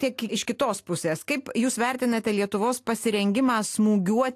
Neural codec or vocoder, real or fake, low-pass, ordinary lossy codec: none; real; 14.4 kHz; AAC, 64 kbps